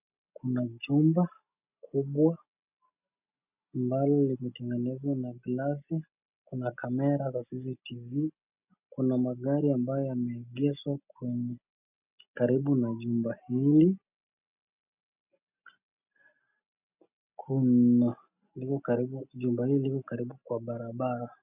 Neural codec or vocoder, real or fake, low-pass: none; real; 3.6 kHz